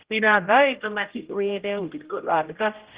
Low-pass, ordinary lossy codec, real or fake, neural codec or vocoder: 3.6 kHz; Opus, 16 kbps; fake; codec, 16 kHz, 0.5 kbps, X-Codec, HuBERT features, trained on general audio